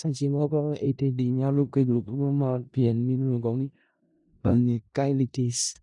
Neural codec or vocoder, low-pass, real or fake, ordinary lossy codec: codec, 16 kHz in and 24 kHz out, 0.4 kbps, LongCat-Audio-Codec, four codebook decoder; 10.8 kHz; fake; none